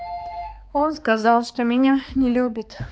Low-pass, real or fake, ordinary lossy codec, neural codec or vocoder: none; fake; none; codec, 16 kHz, 2 kbps, X-Codec, HuBERT features, trained on balanced general audio